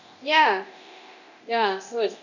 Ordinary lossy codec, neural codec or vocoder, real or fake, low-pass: none; codec, 24 kHz, 0.9 kbps, DualCodec; fake; 7.2 kHz